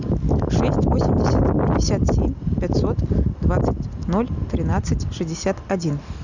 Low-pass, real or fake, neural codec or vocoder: 7.2 kHz; real; none